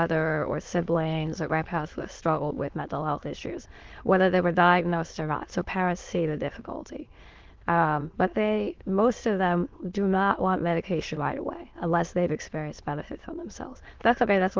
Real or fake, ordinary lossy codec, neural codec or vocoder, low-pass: fake; Opus, 16 kbps; autoencoder, 22.05 kHz, a latent of 192 numbers a frame, VITS, trained on many speakers; 7.2 kHz